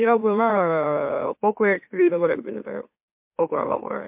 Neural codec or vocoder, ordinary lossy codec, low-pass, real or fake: autoencoder, 44.1 kHz, a latent of 192 numbers a frame, MeloTTS; MP3, 32 kbps; 3.6 kHz; fake